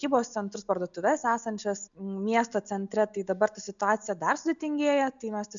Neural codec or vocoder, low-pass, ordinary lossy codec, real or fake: none; 7.2 kHz; MP3, 96 kbps; real